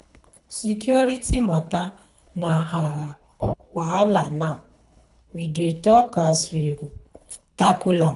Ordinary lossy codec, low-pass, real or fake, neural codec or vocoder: none; 10.8 kHz; fake; codec, 24 kHz, 3 kbps, HILCodec